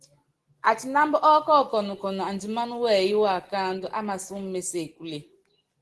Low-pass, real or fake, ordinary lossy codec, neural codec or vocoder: 10.8 kHz; real; Opus, 16 kbps; none